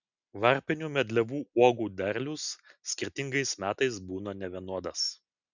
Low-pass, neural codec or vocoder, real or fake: 7.2 kHz; none; real